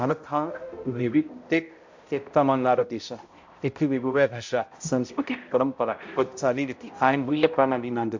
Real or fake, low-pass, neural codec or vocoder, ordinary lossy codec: fake; 7.2 kHz; codec, 16 kHz, 0.5 kbps, X-Codec, HuBERT features, trained on balanced general audio; MP3, 48 kbps